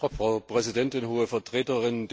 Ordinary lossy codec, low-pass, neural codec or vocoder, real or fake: none; none; none; real